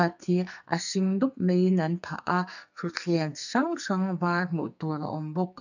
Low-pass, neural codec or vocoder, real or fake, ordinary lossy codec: 7.2 kHz; codec, 44.1 kHz, 2.6 kbps, SNAC; fake; none